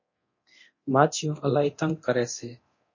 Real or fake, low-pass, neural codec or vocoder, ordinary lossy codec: fake; 7.2 kHz; codec, 24 kHz, 0.9 kbps, DualCodec; MP3, 32 kbps